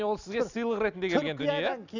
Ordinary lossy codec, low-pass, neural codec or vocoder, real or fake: none; 7.2 kHz; none; real